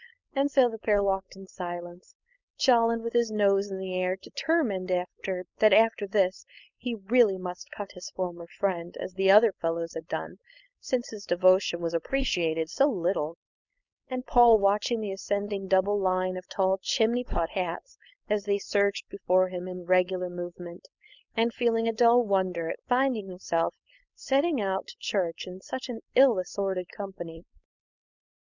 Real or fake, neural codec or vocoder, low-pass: fake; codec, 16 kHz, 4.8 kbps, FACodec; 7.2 kHz